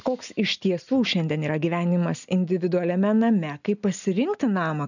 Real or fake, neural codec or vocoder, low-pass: real; none; 7.2 kHz